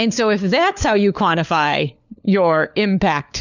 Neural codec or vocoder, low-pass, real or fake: codec, 16 kHz, 4 kbps, FunCodec, trained on LibriTTS, 50 frames a second; 7.2 kHz; fake